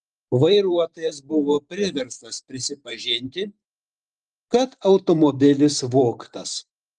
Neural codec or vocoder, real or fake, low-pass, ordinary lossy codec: vocoder, 44.1 kHz, 128 mel bands every 512 samples, BigVGAN v2; fake; 10.8 kHz; Opus, 32 kbps